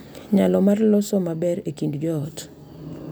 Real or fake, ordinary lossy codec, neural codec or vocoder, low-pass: real; none; none; none